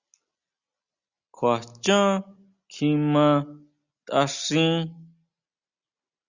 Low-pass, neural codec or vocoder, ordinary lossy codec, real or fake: 7.2 kHz; none; Opus, 64 kbps; real